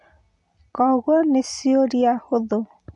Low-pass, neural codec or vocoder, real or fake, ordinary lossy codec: 10.8 kHz; none; real; none